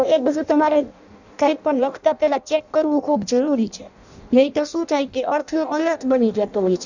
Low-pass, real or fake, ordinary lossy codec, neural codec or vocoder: 7.2 kHz; fake; none; codec, 16 kHz in and 24 kHz out, 0.6 kbps, FireRedTTS-2 codec